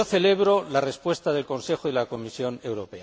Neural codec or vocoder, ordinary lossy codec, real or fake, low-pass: none; none; real; none